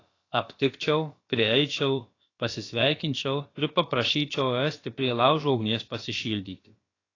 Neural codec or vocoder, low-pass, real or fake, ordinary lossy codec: codec, 16 kHz, about 1 kbps, DyCAST, with the encoder's durations; 7.2 kHz; fake; AAC, 32 kbps